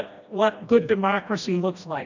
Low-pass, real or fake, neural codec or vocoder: 7.2 kHz; fake; codec, 16 kHz, 1 kbps, FreqCodec, smaller model